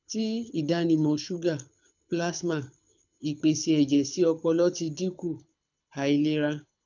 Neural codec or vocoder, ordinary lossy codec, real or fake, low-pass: codec, 24 kHz, 6 kbps, HILCodec; none; fake; 7.2 kHz